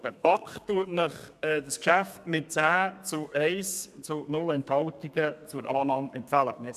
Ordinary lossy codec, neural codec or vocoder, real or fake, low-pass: none; codec, 32 kHz, 1.9 kbps, SNAC; fake; 14.4 kHz